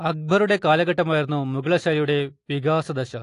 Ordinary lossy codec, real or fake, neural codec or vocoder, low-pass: AAC, 48 kbps; real; none; 10.8 kHz